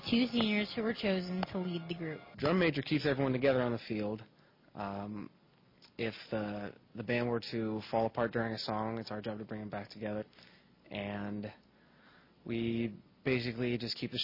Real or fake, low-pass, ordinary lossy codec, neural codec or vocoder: real; 5.4 kHz; MP3, 24 kbps; none